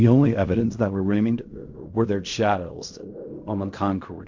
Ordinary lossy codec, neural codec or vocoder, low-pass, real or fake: MP3, 48 kbps; codec, 16 kHz in and 24 kHz out, 0.4 kbps, LongCat-Audio-Codec, fine tuned four codebook decoder; 7.2 kHz; fake